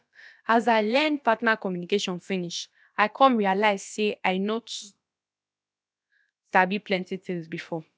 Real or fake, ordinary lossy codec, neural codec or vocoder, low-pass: fake; none; codec, 16 kHz, about 1 kbps, DyCAST, with the encoder's durations; none